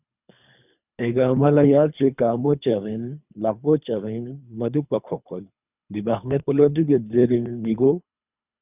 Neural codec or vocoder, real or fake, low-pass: codec, 24 kHz, 3 kbps, HILCodec; fake; 3.6 kHz